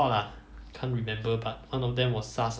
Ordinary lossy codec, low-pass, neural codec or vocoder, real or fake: none; none; none; real